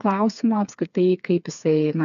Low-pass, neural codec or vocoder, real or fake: 7.2 kHz; codec, 16 kHz, 4 kbps, FreqCodec, smaller model; fake